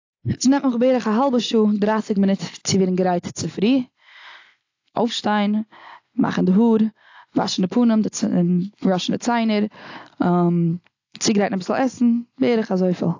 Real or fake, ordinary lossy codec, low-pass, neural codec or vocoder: real; AAC, 48 kbps; 7.2 kHz; none